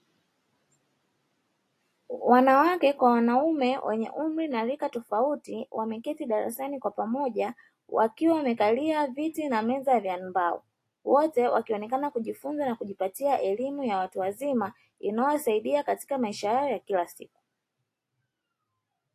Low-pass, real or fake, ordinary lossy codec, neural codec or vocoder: 14.4 kHz; real; AAC, 48 kbps; none